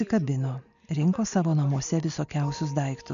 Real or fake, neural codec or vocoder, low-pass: real; none; 7.2 kHz